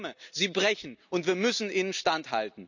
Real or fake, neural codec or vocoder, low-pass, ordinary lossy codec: real; none; 7.2 kHz; none